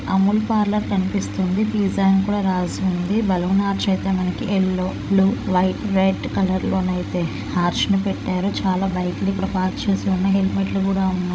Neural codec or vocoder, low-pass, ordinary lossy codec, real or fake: codec, 16 kHz, 16 kbps, FreqCodec, larger model; none; none; fake